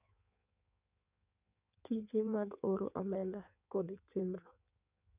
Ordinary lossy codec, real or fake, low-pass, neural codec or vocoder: none; fake; 3.6 kHz; codec, 16 kHz in and 24 kHz out, 1.1 kbps, FireRedTTS-2 codec